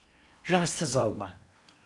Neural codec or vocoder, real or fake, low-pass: codec, 16 kHz in and 24 kHz out, 0.8 kbps, FocalCodec, streaming, 65536 codes; fake; 10.8 kHz